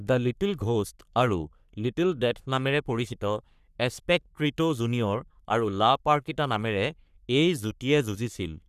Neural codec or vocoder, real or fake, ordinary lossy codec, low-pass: codec, 44.1 kHz, 3.4 kbps, Pupu-Codec; fake; none; 14.4 kHz